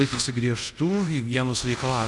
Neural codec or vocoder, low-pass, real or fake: codec, 24 kHz, 0.5 kbps, DualCodec; 10.8 kHz; fake